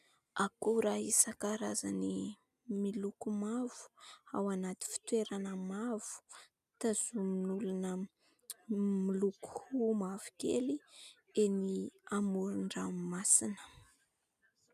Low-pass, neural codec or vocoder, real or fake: 14.4 kHz; none; real